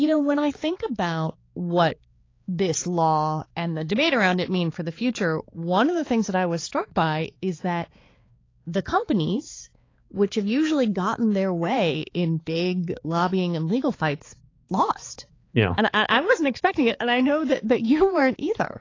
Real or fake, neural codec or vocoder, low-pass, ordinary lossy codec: fake; codec, 16 kHz, 4 kbps, X-Codec, HuBERT features, trained on balanced general audio; 7.2 kHz; AAC, 32 kbps